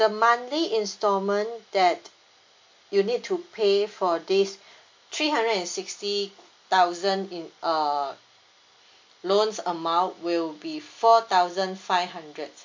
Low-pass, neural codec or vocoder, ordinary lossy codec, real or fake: 7.2 kHz; none; MP3, 48 kbps; real